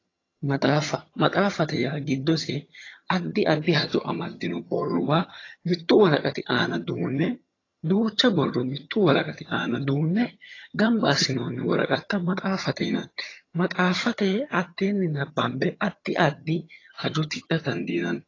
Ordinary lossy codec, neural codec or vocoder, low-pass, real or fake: AAC, 32 kbps; vocoder, 22.05 kHz, 80 mel bands, HiFi-GAN; 7.2 kHz; fake